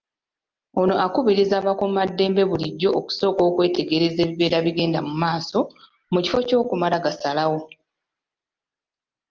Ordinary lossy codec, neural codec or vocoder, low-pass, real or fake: Opus, 24 kbps; none; 7.2 kHz; real